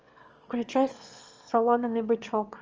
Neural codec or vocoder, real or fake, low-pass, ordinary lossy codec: autoencoder, 22.05 kHz, a latent of 192 numbers a frame, VITS, trained on one speaker; fake; 7.2 kHz; Opus, 24 kbps